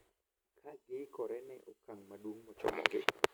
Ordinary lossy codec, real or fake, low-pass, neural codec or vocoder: none; real; none; none